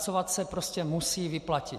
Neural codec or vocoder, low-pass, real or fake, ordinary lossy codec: none; 14.4 kHz; real; MP3, 64 kbps